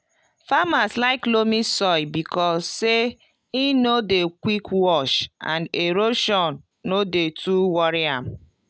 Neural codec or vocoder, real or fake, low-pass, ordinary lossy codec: none; real; none; none